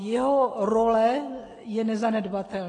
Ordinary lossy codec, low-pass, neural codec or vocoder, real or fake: AAC, 32 kbps; 10.8 kHz; none; real